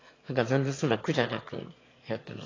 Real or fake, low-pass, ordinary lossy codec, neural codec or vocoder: fake; 7.2 kHz; AAC, 32 kbps; autoencoder, 22.05 kHz, a latent of 192 numbers a frame, VITS, trained on one speaker